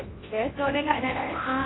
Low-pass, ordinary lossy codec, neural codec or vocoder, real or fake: 7.2 kHz; AAC, 16 kbps; codec, 24 kHz, 0.9 kbps, WavTokenizer, large speech release; fake